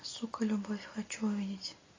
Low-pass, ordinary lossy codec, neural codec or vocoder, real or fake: 7.2 kHz; AAC, 32 kbps; none; real